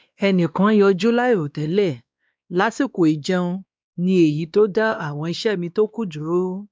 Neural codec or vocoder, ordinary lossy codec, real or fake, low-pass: codec, 16 kHz, 2 kbps, X-Codec, WavLM features, trained on Multilingual LibriSpeech; none; fake; none